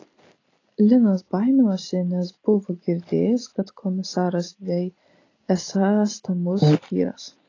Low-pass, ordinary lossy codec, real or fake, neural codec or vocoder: 7.2 kHz; AAC, 32 kbps; real; none